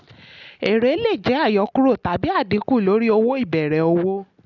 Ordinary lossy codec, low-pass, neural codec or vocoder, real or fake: none; 7.2 kHz; none; real